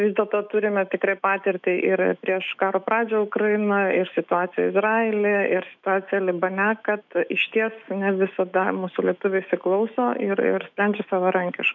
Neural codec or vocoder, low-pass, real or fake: autoencoder, 48 kHz, 128 numbers a frame, DAC-VAE, trained on Japanese speech; 7.2 kHz; fake